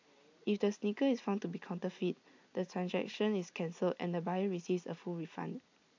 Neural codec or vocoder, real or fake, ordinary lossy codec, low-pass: none; real; AAC, 48 kbps; 7.2 kHz